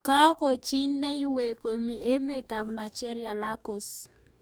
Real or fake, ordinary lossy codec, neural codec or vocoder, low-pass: fake; none; codec, 44.1 kHz, 2.6 kbps, DAC; none